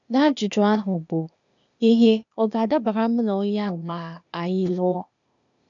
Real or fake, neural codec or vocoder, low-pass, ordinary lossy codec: fake; codec, 16 kHz, 0.8 kbps, ZipCodec; 7.2 kHz; none